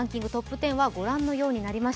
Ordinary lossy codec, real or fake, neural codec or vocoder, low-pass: none; real; none; none